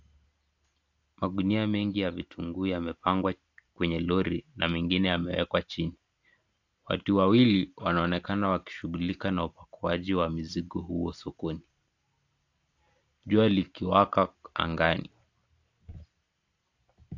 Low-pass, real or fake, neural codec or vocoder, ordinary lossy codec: 7.2 kHz; real; none; AAC, 48 kbps